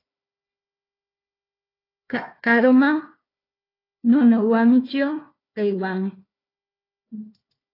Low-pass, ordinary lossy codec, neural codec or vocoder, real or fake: 5.4 kHz; AAC, 24 kbps; codec, 16 kHz, 1 kbps, FunCodec, trained on Chinese and English, 50 frames a second; fake